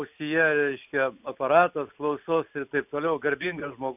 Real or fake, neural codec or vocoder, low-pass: real; none; 3.6 kHz